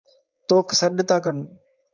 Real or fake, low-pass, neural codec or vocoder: fake; 7.2 kHz; codec, 16 kHz, 4.8 kbps, FACodec